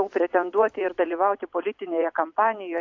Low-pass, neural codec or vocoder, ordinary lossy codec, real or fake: 7.2 kHz; vocoder, 44.1 kHz, 128 mel bands every 256 samples, BigVGAN v2; AAC, 48 kbps; fake